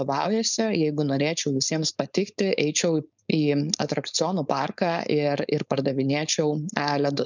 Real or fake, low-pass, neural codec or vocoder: fake; 7.2 kHz; codec, 16 kHz, 4.8 kbps, FACodec